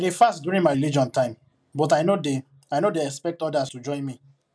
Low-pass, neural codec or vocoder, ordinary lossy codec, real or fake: none; none; none; real